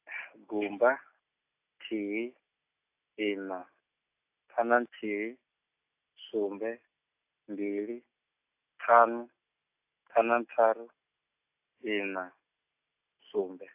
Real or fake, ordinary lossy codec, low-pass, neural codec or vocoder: real; AAC, 32 kbps; 3.6 kHz; none